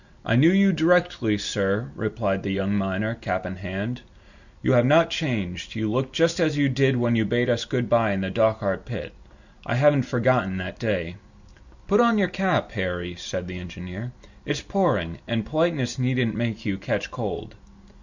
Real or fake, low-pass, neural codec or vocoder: real; 7.2 kHz; none